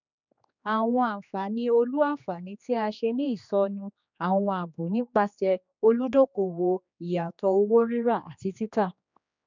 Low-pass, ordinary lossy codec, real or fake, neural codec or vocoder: 7.2 kHz; none; fake; codec, 16 kHz, 2 kbps, X-Codec, HuBERT features, trained on general audio